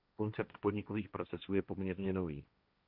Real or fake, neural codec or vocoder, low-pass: fake; codec, 16 kHz, 1.1 kbps, Voila-Tokenizer; 5.4 kHz